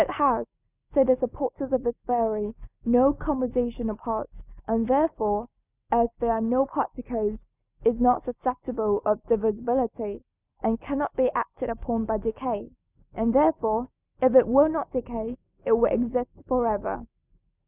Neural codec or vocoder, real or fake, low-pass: none; real; 3.6 kHz